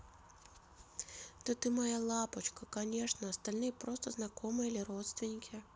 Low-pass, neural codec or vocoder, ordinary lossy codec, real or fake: none; none; none; real